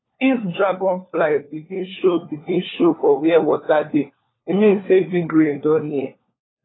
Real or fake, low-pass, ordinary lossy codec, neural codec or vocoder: fake; 7.2 kHz; AAC, 16 kbps; codec, 16 kHz, 4 kbps, FunCodec, trained on LibriTTS, 50 frames a second